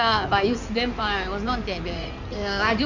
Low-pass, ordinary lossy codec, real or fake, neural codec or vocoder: 7.2 kHz; none; fake; codec, 16 kHz in and 24 kHz out, 2.2 kbps, FireRedTTS-2 codec